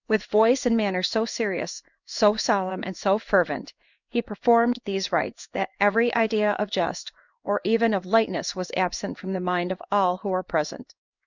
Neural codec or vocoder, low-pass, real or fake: codec, 16 kHz in and 24 kHz out, 1 kbps, XY-Tokenizer; 7.2 kHz; fake